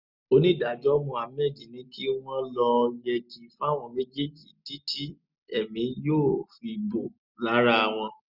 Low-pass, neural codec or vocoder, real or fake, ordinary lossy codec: 5.4 kHz; none; real; none